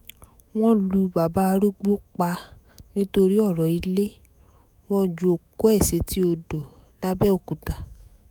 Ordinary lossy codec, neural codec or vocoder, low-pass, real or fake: none; autoencoder, 48 kHz, 128 numbers a frame, DAC-VAE, trained on Japanese speech; none; fake